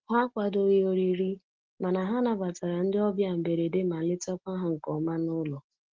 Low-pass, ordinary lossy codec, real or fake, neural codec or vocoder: 7.2 kHz; Opus, 16 kbps; real; none